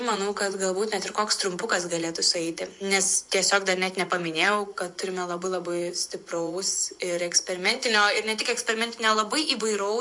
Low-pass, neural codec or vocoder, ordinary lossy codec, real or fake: 10.8 kHz; vocoder, 24 kHz, 100 mel bands, Vocos; MP3, 64 kbps; fake